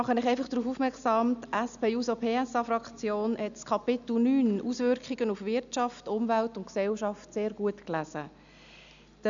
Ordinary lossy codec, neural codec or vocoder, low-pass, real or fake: none; none; 7.2 kHz; real